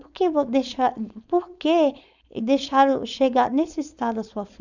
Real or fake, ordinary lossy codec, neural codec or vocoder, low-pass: fake; none; codec, 16 kHz, 4.8 kbps, FACodec; 7.2 kHz